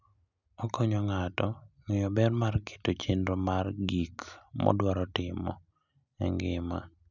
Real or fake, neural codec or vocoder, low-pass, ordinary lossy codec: real; none; 7.2 kHz; none